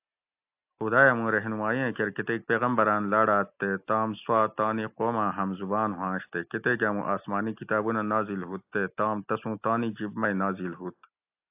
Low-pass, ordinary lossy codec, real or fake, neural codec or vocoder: 3.6 kHz; AAC, 32 kbps; real; none